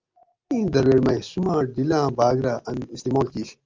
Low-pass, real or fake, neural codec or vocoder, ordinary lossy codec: 7.2 kHz; real; none; Opus, 32 kbps